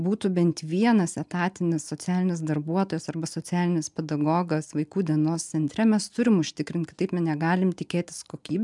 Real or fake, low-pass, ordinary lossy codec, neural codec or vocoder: real; 10.8 kHz; MP3, 96 kbps; none